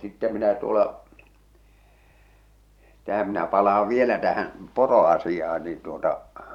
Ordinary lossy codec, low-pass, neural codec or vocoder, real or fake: none; 19.8 kHz; vocoder, 44.1 kHz, 128 mel bands every 512 samples, BigVGAN v2; fake